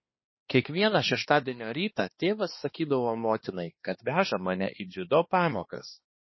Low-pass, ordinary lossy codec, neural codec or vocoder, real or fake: 7.2 kHz; MP3, 24 kbps; codec, 16 kHz, 2 kbps, X-Codec, HuBERT features, trained on balanced general audio; fake